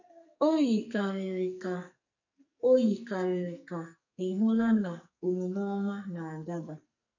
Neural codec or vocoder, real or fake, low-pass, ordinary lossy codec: codec, 44.1 kHz, 2.6 kbps, SNAC; fake; 7.2 kHz; none